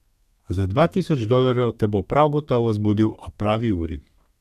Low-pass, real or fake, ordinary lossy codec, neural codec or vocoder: 14.4 kHz; fake; none; codec, 32 kHz, 1.9 kbps, SNAC